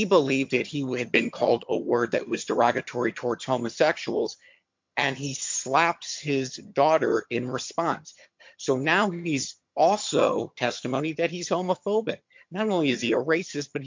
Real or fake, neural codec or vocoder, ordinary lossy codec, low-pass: fake; vocoder, 22.05 kHz, 80 mel bands, HiFi-GAN; MP3, 48 kbps; 7.2 kHz